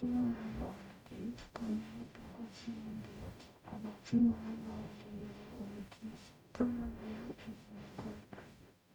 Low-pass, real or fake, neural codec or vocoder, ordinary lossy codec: 19.8 kHz; fake; codec, 44.1 kHz, 0.9 kbps, DAC; none